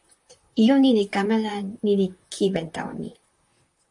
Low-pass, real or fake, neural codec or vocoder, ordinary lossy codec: 10.8 kHz; fake; vocoder, 44.1 kHz, 128 mel bands, Pupu-Vocoder; AAC, 64 kbps